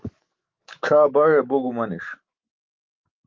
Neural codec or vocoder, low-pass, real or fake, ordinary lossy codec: autoencoder, 48 kHz, 128 numbers a frame, DAC-VAE, trained on Japanese speech; 7.2 kHz; fake; Opus, 32 kbps